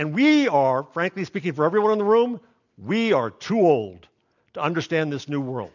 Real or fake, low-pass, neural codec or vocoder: real; 7.2 kHz; none